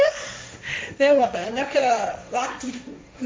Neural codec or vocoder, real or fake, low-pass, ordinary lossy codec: codec, 16 kHz, 1.1 kbps, Voila-Tokenizer; fake; none; none